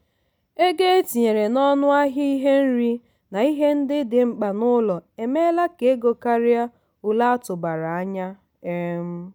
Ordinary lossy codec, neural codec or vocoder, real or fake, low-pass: none; none; real; none